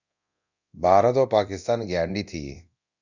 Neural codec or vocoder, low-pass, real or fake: codec, 24 kHz, 0.9 kbps, DualCodec; 7.2 kHz; fake